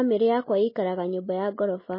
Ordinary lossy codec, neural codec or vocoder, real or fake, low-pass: MP3, 24 kbps; none; real; 5.4 kHz